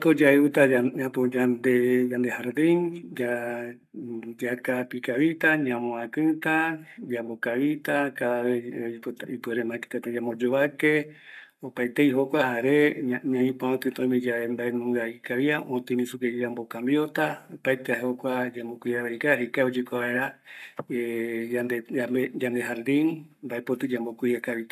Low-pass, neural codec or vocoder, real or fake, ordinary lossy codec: 14.4 kHz; codec, 44.1 kHz, 7.8 kbps, Pupu-Codec; fake; none